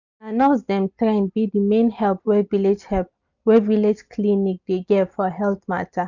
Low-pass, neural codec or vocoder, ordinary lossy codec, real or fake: 7.2 kHz; none; none; real